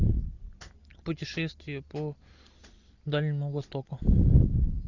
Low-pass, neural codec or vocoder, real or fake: 7.2 kHz; none; real